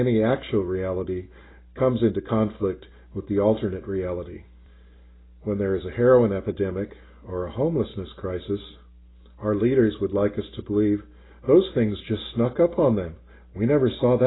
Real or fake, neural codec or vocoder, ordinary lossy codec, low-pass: real; none; AAC, 16 kbps; 7.2 kHz